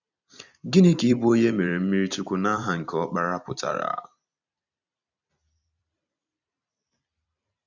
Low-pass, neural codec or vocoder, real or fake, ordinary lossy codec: 7.2 kHz; vocoder, 44.1 kHz, 128 mel bands every 256 samples, BigVGAN v2; fake; none